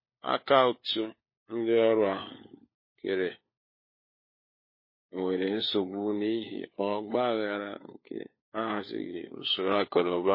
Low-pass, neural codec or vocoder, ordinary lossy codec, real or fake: 5.4 kHz; codec, 16 kHz, 4 kbps, FunCodec, trained on LibriTTS, 50 frames a second; MP3, 24 kbps; fake